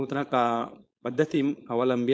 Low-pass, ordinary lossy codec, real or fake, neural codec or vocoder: none; none; fake; codec, 16 kHz, 4.8 kbps, FACodec